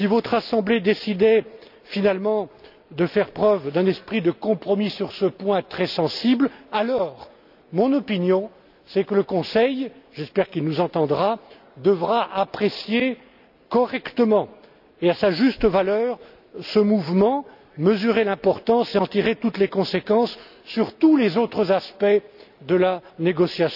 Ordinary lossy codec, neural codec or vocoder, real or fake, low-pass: none; none; real; 5.4 kHz